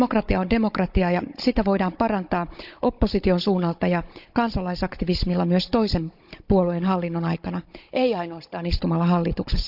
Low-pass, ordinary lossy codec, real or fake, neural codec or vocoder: 5.4 kHz; none; fake; codec, 16 kHz, 16 kbps, FunCodec, trained on Chinese and English, 50 frames a second